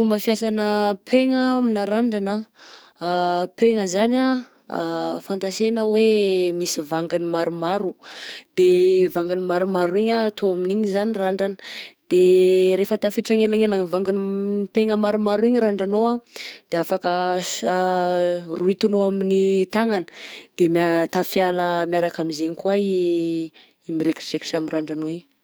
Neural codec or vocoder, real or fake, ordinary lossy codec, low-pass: codec, 44.1 kHz, 2.6 kbps, SNAC; fake; none; none